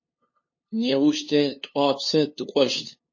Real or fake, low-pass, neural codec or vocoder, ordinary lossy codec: fake; 7.2 kHz; codec, 16 kHz, 2 kbps, FunCodec, trained on LibriTTS, 25 frames a second; MP3, 32 kbps